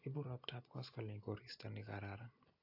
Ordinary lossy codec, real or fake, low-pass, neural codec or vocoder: AAC, 32 kbps; real; 5.4 kHz; none